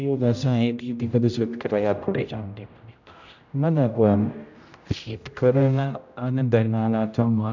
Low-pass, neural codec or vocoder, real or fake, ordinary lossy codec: 7.2 kHz; codec, 16 kHz, 0.5 kbps, X-Codec, HuBERT features, trained on general audio; fake; none